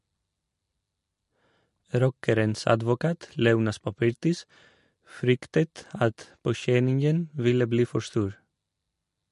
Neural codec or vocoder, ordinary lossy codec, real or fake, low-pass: none; MP3, 48 kbps; real; 14.4 kHz